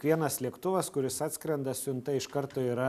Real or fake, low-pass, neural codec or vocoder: real; 14.4 kHz; none